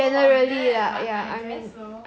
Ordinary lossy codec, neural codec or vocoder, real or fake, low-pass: none; none; real; none